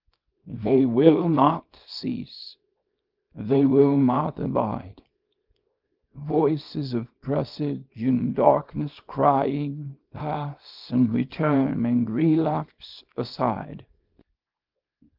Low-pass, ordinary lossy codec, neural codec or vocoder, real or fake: 5.4 kHz; Opus, 32 kbps; codec, 24 kHz, 0.9 kbps, WavTokenizer, small release; fake